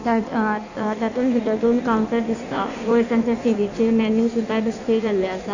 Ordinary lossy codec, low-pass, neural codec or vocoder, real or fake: none; 7.2 kHz; codec, 16 kHz in and 24 kHz out, 1.1 kbps, FireRedTTS-2 codec; fake